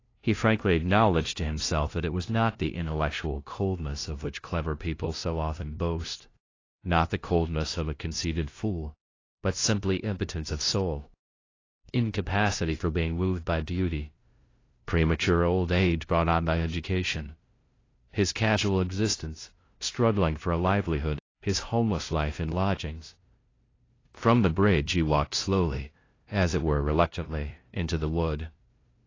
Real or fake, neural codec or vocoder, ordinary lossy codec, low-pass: fake; codec, 16 kHz, 0.5 kbps, FunCodec, trained on LibriTTS, 25 frames a second; AAC, 32 kbps; 7.2 kHz